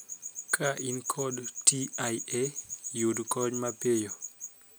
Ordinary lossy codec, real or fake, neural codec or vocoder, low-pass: none; real; none; none